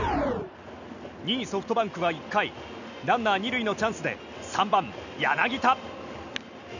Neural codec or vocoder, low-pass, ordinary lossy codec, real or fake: none; 7.2 kHz; none; real